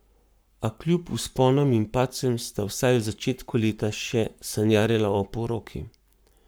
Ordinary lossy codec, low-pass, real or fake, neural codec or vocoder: none; none; fake; codec, 44.1 kHz, 7.8 kbps, Pupu-Codec